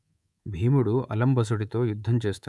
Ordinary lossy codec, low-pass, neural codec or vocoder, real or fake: none; none; codec, 24 kHz, 3.1 kbps, DualCodec; fake